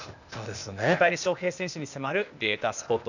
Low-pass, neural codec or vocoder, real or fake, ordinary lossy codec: 7.2 kHz; codec, 16 kHz, 0.8 kbps, ZipCodec; fake; none